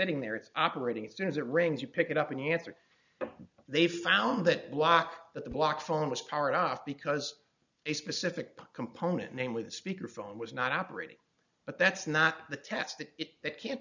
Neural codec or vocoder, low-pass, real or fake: none; 7.2 kHz; real